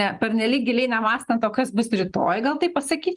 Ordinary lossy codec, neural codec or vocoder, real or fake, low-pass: Opus, 24 kbps; none; real; 10.8 kHz